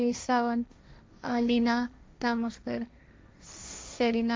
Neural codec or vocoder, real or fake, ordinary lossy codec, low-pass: codec, 16 kHz, 1.1 kbps, Voila-Tokenizer; fake; none; none